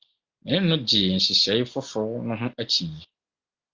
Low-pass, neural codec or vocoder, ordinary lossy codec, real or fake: 7.2 kHz; none; Opus, 24 kbps; real